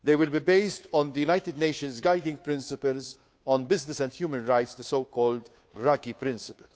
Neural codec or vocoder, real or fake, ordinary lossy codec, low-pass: codec, 16 kHz, 2 kbps, FunCodec, trained on Chinese and English, 25 frames a second; fake; none; none